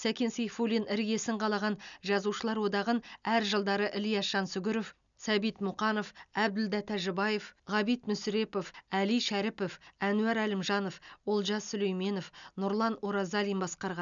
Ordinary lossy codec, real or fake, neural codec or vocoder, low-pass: none; real; none; 7.2 kHz